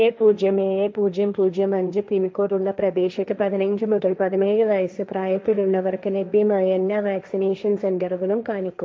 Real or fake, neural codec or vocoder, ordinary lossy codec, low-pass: fake; codec, 16 kHz, 1.1 kbps, Voila-Tokenizer; none; none